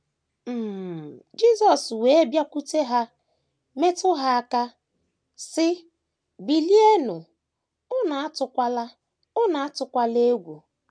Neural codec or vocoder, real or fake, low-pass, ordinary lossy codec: none; real; 9.9 kHz; none